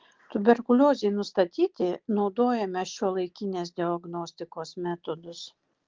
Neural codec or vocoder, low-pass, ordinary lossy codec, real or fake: none; 7.2 kHz; Opus, 16 kbps; real